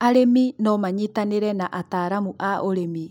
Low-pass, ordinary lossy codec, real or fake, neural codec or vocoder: 19.8 kHz; none; real; none